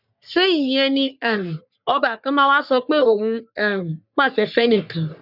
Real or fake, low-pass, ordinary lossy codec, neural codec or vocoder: fake; 5.4 kHz; none; codec, 44.1 kHz, 3.4 kbps, Pupu-Codec